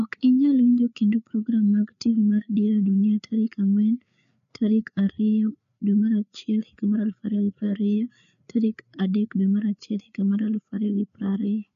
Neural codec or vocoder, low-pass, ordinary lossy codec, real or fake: codec, 16 kHz, 4 kbps, FreqCodec, larger model; 7.2 kHz; none; fake